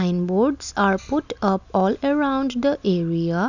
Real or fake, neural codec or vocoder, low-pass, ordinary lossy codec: real; none; 7.2 kHz; none